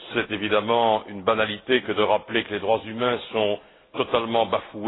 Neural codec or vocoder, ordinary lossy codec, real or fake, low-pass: none; AAC, 16 kbps; real; 7.2 kHz